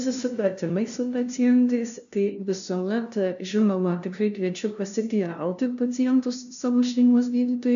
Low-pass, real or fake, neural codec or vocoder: 7.2 kHz; fake; codec, 16 kHz, 0.5 kbps, FunCodec, trained on LibriTTS, 25 frames a second